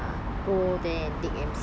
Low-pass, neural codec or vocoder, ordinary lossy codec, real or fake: none; none; none; real